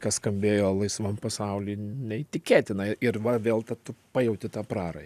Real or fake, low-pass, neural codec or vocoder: real; 14.4 kHz; none